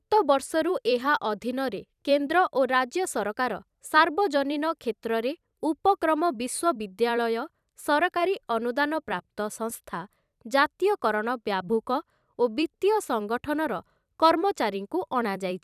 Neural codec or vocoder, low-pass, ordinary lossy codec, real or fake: vocoder, 44.1 kHz, 128 mel bands every 256 samples, BigVGAN v2; 14.4 kHz; none; fake